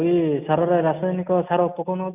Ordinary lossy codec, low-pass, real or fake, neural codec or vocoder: none; 3.6 kHz; real; none